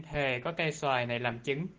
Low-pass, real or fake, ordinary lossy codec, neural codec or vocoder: 7.2 kHz; real; Opus, 16 kbps; none